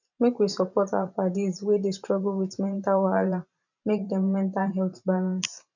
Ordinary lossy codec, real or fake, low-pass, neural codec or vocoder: none; fake; 7.2 kHz; vocoder, 44.1 kHz, 128 mel bands every 512 samples, BigVGAN v2